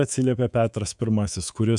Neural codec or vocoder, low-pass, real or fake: codec, 24 kHz, 3.1 kbps, DualCodec; 10.8 kHz; fake